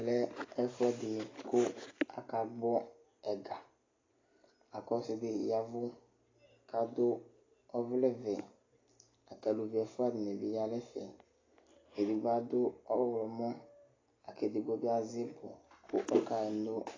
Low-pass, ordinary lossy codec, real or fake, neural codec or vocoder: 7.2 kHz; AAC, 32 kbps; real; none